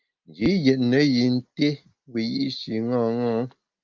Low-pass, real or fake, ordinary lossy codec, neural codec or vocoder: 7.2 kHz; real; Opus, 32 kbps; none